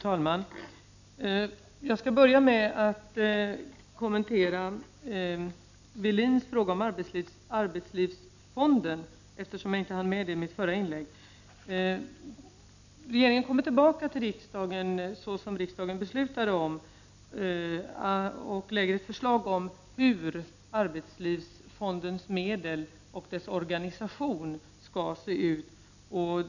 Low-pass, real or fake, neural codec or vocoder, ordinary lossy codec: 7.2 kHz; real; none; none